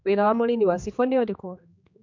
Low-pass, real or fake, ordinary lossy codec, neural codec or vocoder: 7.2 kHz; fake; MP3, 64 kbps; codec, 16 kHz, 2 kbps, X-Codec, HuBERT features, trained on general audio